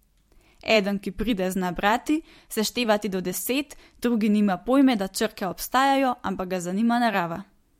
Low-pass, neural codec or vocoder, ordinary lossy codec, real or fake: 19.8 kHz; vocoder, 44.1 kHz, 128 mel bands every 256 samples, BigVGAN v2; MP3, 64 kbps; fake